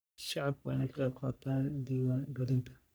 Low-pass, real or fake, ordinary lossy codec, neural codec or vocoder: none; fake; none; codec, 44.1 kHz, 3.4 kbps, Pupu-Codec